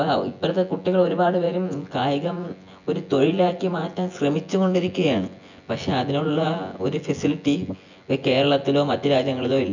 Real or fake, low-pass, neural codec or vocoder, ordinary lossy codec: fake; 7.2 kHz; vocoder, 24 kHz, 100 mel bands, Vocos; none